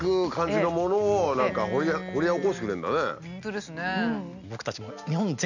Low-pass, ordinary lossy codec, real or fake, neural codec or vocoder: 7.2 kHz; none; real; none